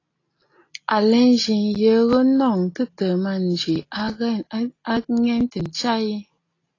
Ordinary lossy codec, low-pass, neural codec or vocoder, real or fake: AAC, 32 kbps; 7.2 kHz; none; real